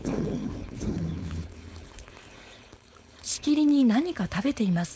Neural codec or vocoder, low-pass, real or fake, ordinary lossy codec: codec, 16 kHz, 4.8 kbps, FACodec; none; fake; none